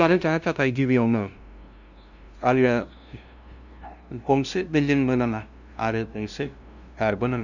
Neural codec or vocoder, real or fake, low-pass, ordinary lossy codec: codec, 16 kHz, 0.5 kbps, FunCodec, trained on LibriTTS, 25 frames a second; fake; 7.2 kHz; none